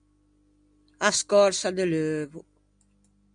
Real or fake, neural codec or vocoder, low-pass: real; none; 9.9 kHz